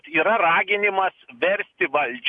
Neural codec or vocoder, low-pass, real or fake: none; 10.8 kHz; real